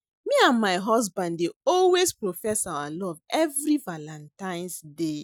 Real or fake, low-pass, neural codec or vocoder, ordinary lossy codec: real; none; none; none